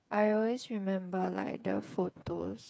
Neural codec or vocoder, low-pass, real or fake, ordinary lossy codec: codec, 16 kHz, 8 kbps, FreqCodec, smaller model; none; fake; none